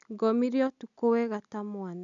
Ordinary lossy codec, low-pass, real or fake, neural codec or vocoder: none; 7.2 kHz; real; none